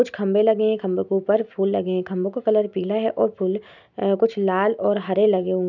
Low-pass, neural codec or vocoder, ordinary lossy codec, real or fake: 7.2 kHz; none; none; real